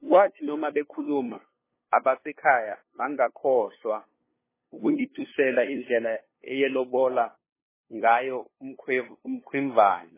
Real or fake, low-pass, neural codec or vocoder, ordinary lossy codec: fake; 3.6 kHz; codec, 16 kHz, 2 kbps, FunCodec, trained on LibriTTS, 25 frames a second; MP3, 16 kbps